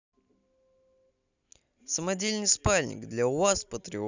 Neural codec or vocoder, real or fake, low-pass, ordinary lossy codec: none; real; 7.2 kHz; none